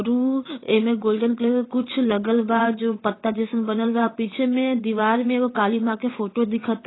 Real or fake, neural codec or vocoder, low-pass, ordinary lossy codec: fake; vocoder, 22.05 kHz, 80 mel bands, WaveNeXt; 7.2 kHz; AAC, 16 kbps